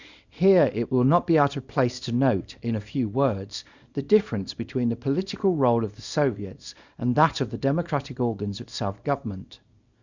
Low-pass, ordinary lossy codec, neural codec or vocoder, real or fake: 7.2 kHz; Opus, 64 kbps; codec, 24 kHz, 0.9 kbps, WavTokenizer, small release; fake